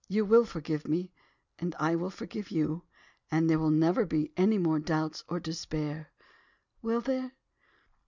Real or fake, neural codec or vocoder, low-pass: real; none; 7.2 kHz